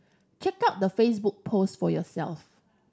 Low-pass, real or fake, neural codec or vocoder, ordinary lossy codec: none; real; none; none